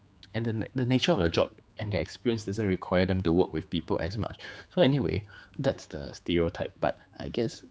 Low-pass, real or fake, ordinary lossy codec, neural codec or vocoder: none; fake; none; codec, 16 kHz, 4 kbps, X-Codec, HuBERT features, trained on general audio